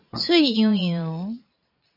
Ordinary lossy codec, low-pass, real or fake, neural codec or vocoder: MP3, 48 kbps; 5.4 kHz; fake; vocoder, 22.05 kHz, 80 mel bands, Vocos